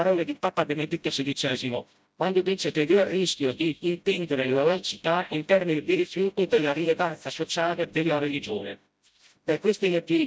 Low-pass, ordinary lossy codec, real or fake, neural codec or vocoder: none; none; fake; codec, 16 kHz, 0.5 kbps, FreqCodec, smaller model